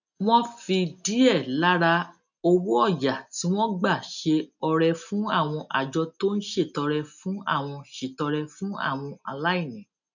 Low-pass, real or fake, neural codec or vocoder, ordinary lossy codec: 7.2 kHz; real; none; none